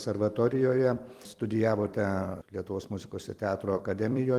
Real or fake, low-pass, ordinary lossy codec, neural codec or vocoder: fake; 14.4 kHz; Opus, 24 kbps; vocoder, 44.1 kHz, 128 mel bands every 256 samples, BigVGAN v2